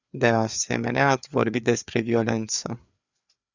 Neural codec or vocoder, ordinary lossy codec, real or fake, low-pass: codec, 16 kHz, 4 kbps, FreqCodec, larger model; Opus, 64 kbps; fake; 7.2 kHz